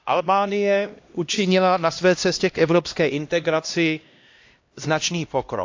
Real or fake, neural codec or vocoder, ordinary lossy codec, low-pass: fake; codec, 16 kHz, 1 kbps, X-Codec, HuBERT features, trained on LibriSpeech; AAC, 48 kbps; 7.2 kHz